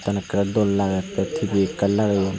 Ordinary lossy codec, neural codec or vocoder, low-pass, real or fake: none; none; none; real